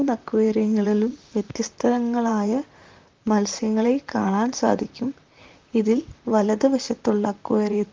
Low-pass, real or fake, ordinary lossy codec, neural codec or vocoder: 7.2 kHz; fake; Opus, 32 kbps; vocoder, 44.1 kHz, 128 mel bands, Pupu-Vocoder